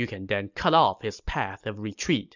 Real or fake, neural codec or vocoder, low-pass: real; none; 7.2 kHz